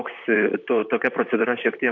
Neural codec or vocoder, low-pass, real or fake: vocoder, 44.1 kHz, 128 mel bands, Pupu-Vocoder; 7.2 kHz; fake